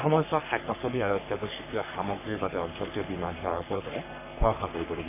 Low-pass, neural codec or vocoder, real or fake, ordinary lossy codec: 3.6 kHz; codec, 44.1 kHz, 3.4 kbps, Pupu-Codec; fake; none